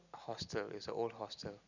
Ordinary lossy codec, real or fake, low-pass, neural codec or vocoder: none; real; 7.2 kHz; none